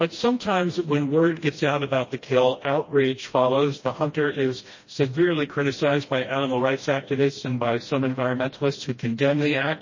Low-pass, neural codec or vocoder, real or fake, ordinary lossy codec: 7.2 kHz; codec, 16 kHz, 1 kbps, FreqCodec, smaller model; fake; MP3, 32 kbps